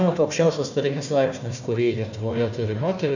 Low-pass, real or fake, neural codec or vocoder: 7.2 kHz; fake; codec, 16 kHz, 1 kbps, FunCodec, trained on Chinese and English, 50 frames a second